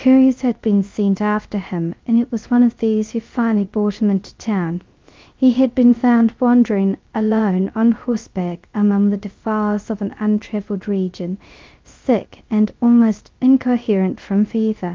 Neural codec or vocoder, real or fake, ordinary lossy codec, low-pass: codec, 16 kHz, 0.3 kbps, FocalCodec; fake; Opus, 32 kbps; 7.2 kHz